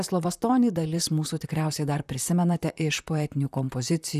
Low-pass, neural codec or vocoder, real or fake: 14.4 kHz; vocoder, 44.1 kHz, 128 mel bands, Pupu-Vocoder; fake